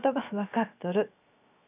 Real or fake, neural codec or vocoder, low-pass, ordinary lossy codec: fake; codec, 16 kHz, 0.7 kbps, FocalCodec; 3.6 kHz; none